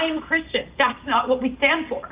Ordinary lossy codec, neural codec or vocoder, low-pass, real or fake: Opus, 32 kbps; none; 3.6 kHz; real